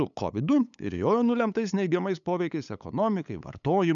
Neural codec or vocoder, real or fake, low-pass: codec, 16 kHz, 8 kbps, FunCodec, trained on LibriTTS, 25 frames a second; fake; 7.2 kHz